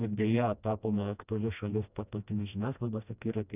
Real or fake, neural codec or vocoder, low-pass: fake; codec, 16 kHz, 1 kbps, FreqCodec, smaller model; 3.6 kHz